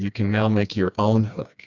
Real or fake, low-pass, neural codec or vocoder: fake; 7.2 kHz; codec, 16 kHz, 2 kbps, FreqCodec, smaller model